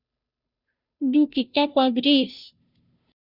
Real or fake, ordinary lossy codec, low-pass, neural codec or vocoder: fake; Opus, 64 kbps; 5.4 kHz; codec, 16 kHz, 0.5 kbps, FunCodec, trained on Chinese and English, 25 frames a second